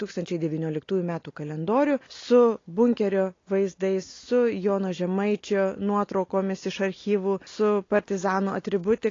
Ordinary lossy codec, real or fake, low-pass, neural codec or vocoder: AAC, 32 kbps; real; 7.2 kHz; none